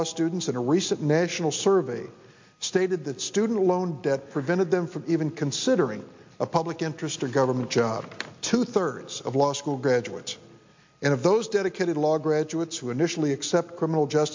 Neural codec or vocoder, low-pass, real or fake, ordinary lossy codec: none; 7.2 kHz; real; MP3, 48 kbps